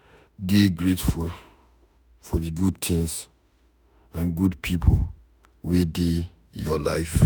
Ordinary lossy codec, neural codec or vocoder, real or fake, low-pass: none; autoencoder, 48 kHz, 32 numbers a frame, DAC-VAE, trained on Japanese speech; fake; none